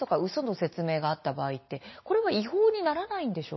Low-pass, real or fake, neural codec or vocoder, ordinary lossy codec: 7.2 kHz; real; none; MP3, 24 kbps